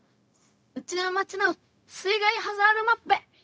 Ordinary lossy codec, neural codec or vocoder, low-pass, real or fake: none; codec, 16 kHz, 0.4 kbps, LongCat-Audio-Codec; none; fake